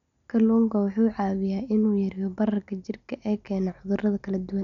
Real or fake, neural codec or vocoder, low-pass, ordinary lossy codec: real; none; 7.2 kHz; none